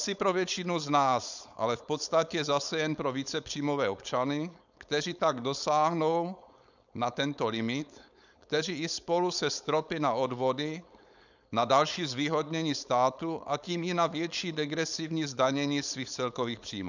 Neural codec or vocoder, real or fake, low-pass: codec, 16 kHz, 4.8 kbps, FACodec; fake; 7.2 kHz